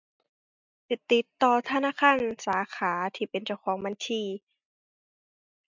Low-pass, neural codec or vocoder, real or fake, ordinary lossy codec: 7.2 kHz; none; real; MP3, 64 kbps